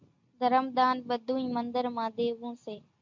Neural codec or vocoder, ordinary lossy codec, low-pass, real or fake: none; MP3, 64 kbps; 7.2 kHz; real